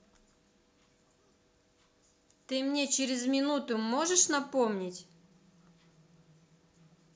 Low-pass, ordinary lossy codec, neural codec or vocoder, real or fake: none; none; none; real